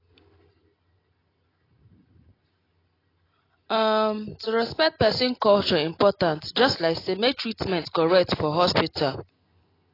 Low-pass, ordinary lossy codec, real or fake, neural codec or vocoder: 5.4 kHz; AAC, 24 kbps; real; none